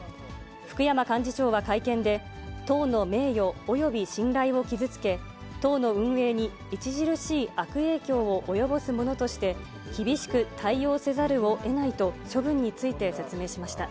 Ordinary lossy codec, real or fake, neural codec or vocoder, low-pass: none; real; none; none